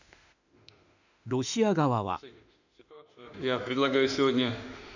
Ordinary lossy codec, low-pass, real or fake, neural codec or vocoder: none; 7.2 kHz; fake; autoencoder, 48 kHz, 32 numbers a frame, DAC-VAE, trained on Japanese speech